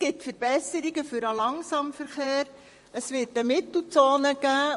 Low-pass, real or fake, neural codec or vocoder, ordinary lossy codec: 14.4 kHz; fake; vocoder, 44.1 kHz, 128 mel bands, Pupu-Vocoder; MP3, 48 kbps